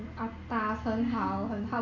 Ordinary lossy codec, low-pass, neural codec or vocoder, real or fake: none; 7.2 kHz; none; real